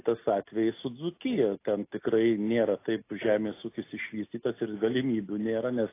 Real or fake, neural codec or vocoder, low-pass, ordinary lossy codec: real; none; 3.6 kHz; AAC, 24 kbps